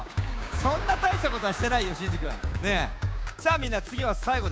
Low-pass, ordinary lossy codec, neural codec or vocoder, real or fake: none; none; codec, 16 kHz, 6 kbps, DAC; fake